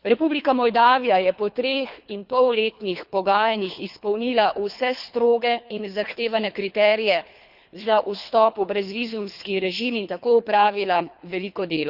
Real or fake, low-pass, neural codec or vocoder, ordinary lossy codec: fake; 5.4 kHz; codec, 24 kHz, 3 kbps, HILCodec; Opus, 64 kbps